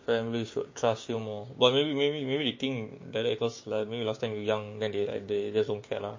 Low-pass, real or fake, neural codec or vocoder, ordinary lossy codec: 7.2 kHz; fake; codec, 16 kHz, 6 kbps, DAC; MP3, 32 kbps